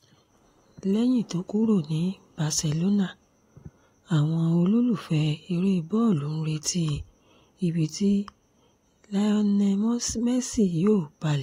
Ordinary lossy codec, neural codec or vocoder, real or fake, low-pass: AAC, 48 kbps; none; real; 19.8 kHz